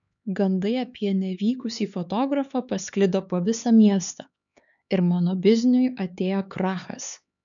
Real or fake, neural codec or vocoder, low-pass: fake; codec, 16 kHz, 4 kbps, X-Codec, HuBERT features, trained on LibriSpeech; 7.2 kHz